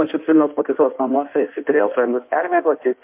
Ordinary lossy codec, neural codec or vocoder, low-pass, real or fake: MP3, 32 kbps; codec, 16 kHz in and 24 kHz out, 1.1 kbps, FireRedTTS-2 codec; 3.6 kHz; fake